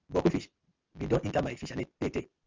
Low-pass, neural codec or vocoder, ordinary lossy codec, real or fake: 7.2 kHz; none; Opus, 24 kbps; real